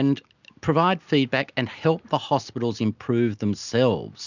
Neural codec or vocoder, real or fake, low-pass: none; real; 7.2 kHz